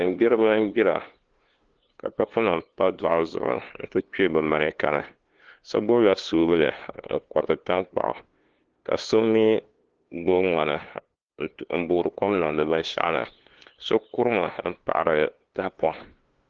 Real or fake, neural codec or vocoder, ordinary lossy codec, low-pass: fake; codec, 16 kHz, 2 kbps, FunCodec, trained on LibriTTS, 25 frames a second; Opus, 16 kbps; 7.2 kHz